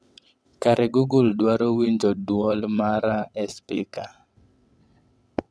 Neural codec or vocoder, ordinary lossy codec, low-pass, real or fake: vocoder, 22.05 kHz, 80 mel bands, WaveNeXt; none; none; fake